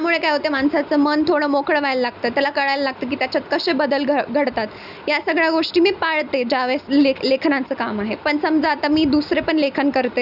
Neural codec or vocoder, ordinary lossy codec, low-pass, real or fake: none; none; 5.4 kHz; real